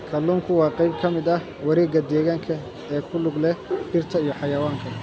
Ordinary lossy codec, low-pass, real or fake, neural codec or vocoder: none; none; real; none